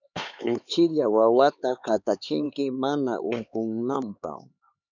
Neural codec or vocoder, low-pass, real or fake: codec, 16 kHz, 4 kbps, X-Codec, HuBERT features, trained on LibriSpeech; 7.2 kHz; fake